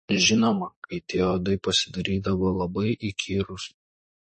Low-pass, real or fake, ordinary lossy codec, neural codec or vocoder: 10.8 kHz; fake; MP3, 32 kbps; vocoder, 44.1 kHz, 128 mel bands, Pupu-Vocoder